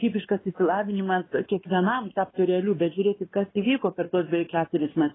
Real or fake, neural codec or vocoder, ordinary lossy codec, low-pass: fake; codec, 16 kHz, 2 kbps, X-Codec, WavLM features, trained on Multilingual LibriSpeech; AAC, 16 kbps; 7.2 kHz